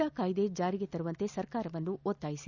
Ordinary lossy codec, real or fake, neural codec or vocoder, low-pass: none; real; none; 7.2 kHz